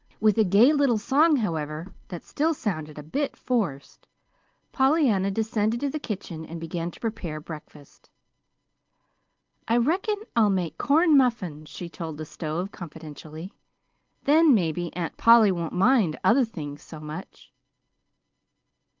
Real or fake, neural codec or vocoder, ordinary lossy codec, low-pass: real; none; Opus, 32 kbps; 7.2 kHz